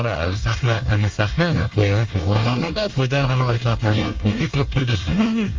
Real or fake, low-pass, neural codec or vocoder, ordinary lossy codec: fake; 7.2 kHz; codec, 24 kHz, 1 kbps, SNAC; Opus, 32 kbps